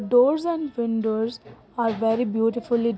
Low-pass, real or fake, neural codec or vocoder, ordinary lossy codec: none; real; none; none